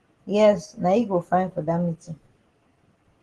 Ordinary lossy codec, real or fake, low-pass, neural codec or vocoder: Opus, 16 kbps; real; 10.8 kHz; none